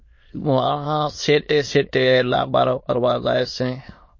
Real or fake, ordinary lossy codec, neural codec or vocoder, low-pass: fake; MP3, 32 kbps; autoencoder, 22.05 kHz, a latent of 192 numbers a frame, VITS, trained on many speakers; 7.2 kHz